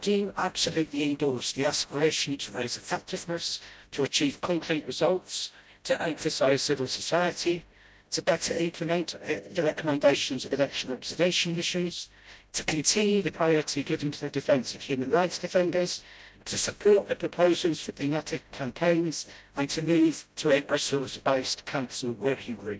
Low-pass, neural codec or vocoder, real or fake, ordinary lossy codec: none; codec, 16 kHz, 0.5 kbps, FreqCodec, smaller model; fake; none